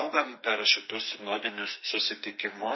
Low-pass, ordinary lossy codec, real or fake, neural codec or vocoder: 7.2 kHz; MP3, 24 kbps; fake; codec, 44.1 kHz, 2.6 kbps, SNAC